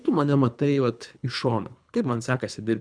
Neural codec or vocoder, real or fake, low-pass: codec, 24 kHz, 3 kbps, HILCodec; fake; 9.9 kHz